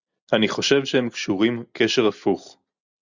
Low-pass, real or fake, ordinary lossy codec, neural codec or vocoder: 7.2 kHz; real; Opus, 64 kbps; none